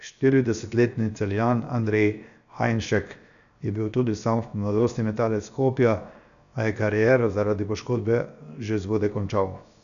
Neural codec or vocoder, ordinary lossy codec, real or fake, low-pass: codec, 16 kHz, 0.7 kbps, FocalCodec; none; fake; 7.2 kHz